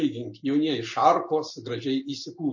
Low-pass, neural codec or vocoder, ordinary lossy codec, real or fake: 7.2 kHz; none; MP3, 32 kbps; real